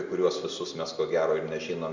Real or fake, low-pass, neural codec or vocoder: real; 7.2 kHz; none